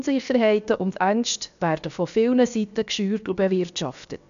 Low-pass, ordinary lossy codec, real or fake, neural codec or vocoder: 7.2 kHz; none; fake; codec, 16 kHz, 0.7 kbps, FocalCodec